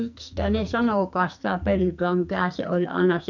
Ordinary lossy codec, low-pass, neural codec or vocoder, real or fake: none; 7.2 kHz; codec, 44.1 kHz, 2.6 kbps, SNAC; fake